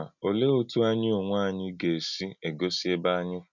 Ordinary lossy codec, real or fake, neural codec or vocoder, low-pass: none; real; none; 7.2 kHz